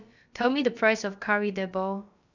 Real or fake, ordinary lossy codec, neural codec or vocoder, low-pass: fake; none; codec, 16 kHz, about 1 kbps, DyCAST, with the encoder's durations; 7.2 kHz